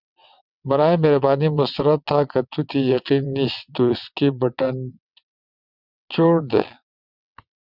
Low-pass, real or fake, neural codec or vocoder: 5.4 kHz; fake; vocoder, 22.05 kHz, 80 mel bands, WaveNeXt